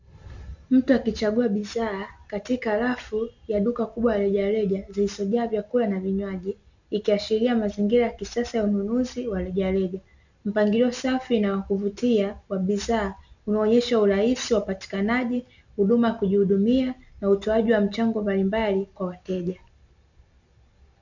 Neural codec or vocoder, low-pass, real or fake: none; 7.2 kHz; real